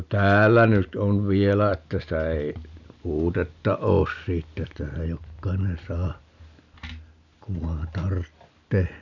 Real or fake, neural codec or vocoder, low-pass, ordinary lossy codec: real; none; 7.2 kHz; none